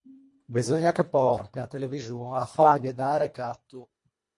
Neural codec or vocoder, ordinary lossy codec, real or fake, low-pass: codec, 24 kHz, 1.5 kbps, HILCodec; MP3, 48 kbps; fake; 10.8 kHz